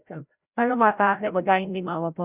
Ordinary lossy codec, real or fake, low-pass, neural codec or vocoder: none; fake; 3.6 kHz; codec, 16 kHz, 0.5 kbps, FreqCodec, larger model